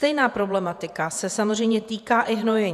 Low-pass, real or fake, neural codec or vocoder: 14.4 kHz; fake; vocoder, 48 kHz, 128 mel bands, Vocos